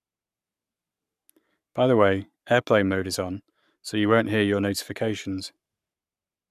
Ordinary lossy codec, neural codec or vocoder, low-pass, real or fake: none; codec, 44.1 kHz, 7.8 kbps, Pupu-Codec; 14.4 kHz; fake